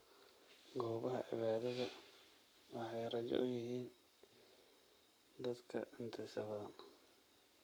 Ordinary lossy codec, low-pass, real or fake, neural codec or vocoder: none; none; fake; codec, 44.1 kHz, 7.8 kbps, Pupu-Codec